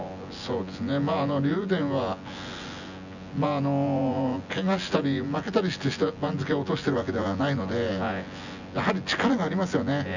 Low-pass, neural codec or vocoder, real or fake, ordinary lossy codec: 7.2 kHz; vocoder, 24 kHz, 100 mel bands, Vocos; fake; none